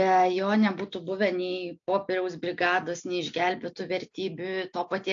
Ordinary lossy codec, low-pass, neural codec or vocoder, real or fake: AAC, 64 kbps; 7.2 kHz; none; real